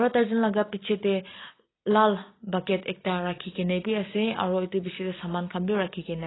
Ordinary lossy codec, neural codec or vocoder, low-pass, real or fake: AAC, 16 kbps; none; 7.2 kHz; real